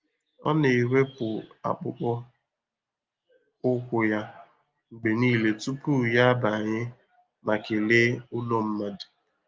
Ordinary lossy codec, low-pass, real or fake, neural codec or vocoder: Opus, 32 kbps; 7.2 kHz; real; none